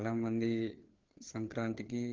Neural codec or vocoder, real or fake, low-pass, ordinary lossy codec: codec, 16 kHz, 4 kbps, FreqCodec, larger model; fake; 7.2 kHz; Opus, 16 kbps